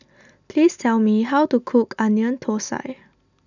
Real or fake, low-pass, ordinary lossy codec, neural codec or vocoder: real; 7.2 kHz; none; none